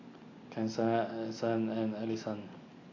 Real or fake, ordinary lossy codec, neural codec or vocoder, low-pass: real; AAC, 32 kbps; none; 7.2 kHz